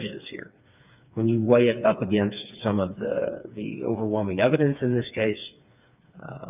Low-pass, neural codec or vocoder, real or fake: 3.6 kHz; codec, 16 kHz, 4 kbps, FreqCodec, smaller model; fake